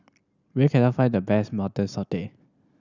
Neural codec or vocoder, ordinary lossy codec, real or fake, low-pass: none; none; real; 7.2 kHz